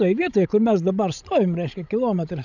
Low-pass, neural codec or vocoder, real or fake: 7.2 kHz; codec, 16 kHz, 16 kbps, FreqCodec, larger model; fake